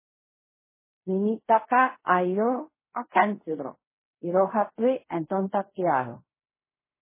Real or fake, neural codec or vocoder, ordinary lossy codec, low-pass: fake; codec, 16 kHz in and 24 kHz out, 0.4 kbps, LongCat-Audio-Codec, fine tuned four codebook decoder; MP3, 16 kbps; 3.6 kHz